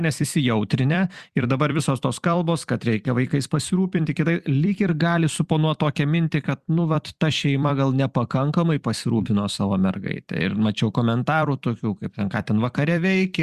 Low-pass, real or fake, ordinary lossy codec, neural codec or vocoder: 14.4 kHz; fake; Opus, 64 kbps; vocoder, 44.1 kHz, 128 mel bands every 256 samples, BigVGAN v2